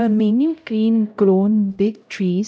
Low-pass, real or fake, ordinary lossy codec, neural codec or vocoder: none; fake; none; codec, 16 kHz, 0.5 kbps, X-Codec, HuBERT features, trained on LibriSpeech